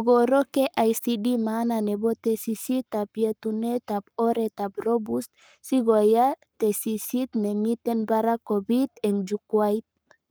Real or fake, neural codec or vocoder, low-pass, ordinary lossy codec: fake; codec, 44.1 kHz, 7.8 kbps, Pupu-Codec; none; none